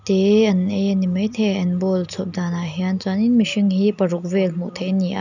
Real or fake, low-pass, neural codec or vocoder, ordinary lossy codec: real; 7.2 kHz; none; none